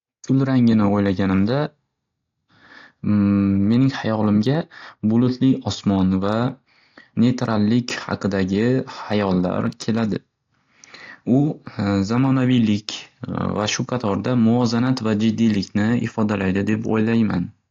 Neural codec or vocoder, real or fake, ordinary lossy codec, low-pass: none; real; AAC, 48 kbps; 7.2 kHz